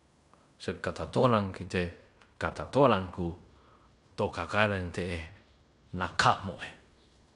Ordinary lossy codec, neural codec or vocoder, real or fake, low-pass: none; codec, 16 kHz in and 24 kHz out, 0.9 kbps, LongCat-Audio-Codec, fine tuned four codebook decoder; fake; 10.8 kHz